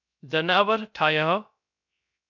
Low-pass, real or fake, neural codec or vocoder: 7.2 kHz; fake; codec, 16 kHz, 0.3 kbps, FocalCodec